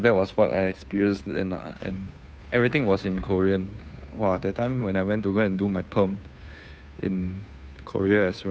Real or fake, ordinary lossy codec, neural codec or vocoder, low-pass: fake; none; codec, 16 kHz, 2 kbps, FunCodec, trained on Chinese and English, 25 frames a second; none